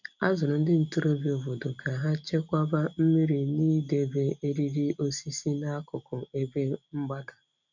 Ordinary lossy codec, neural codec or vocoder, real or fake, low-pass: none; none; real; 7.2 kHz